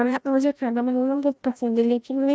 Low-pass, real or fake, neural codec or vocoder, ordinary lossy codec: none; fake; codec, 16 kHz, 0.5 kbps, FreqCodec, larger model; none